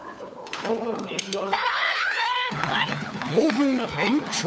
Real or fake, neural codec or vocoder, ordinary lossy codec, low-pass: fake; codec, 16 kHz, 4 kbps, FunCodec, trained on LibriTTS, 50 frames a second; none; none